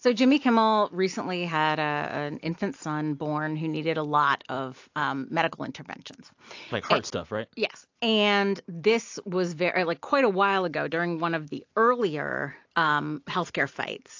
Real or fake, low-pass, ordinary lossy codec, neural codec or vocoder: real; 7.2 kHz; AAC, 48 kbps; none